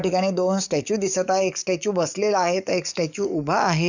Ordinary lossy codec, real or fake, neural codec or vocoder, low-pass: none; fake; codec, 44.1 kHz, 7.8 kbps, DAC; 7.2 kHz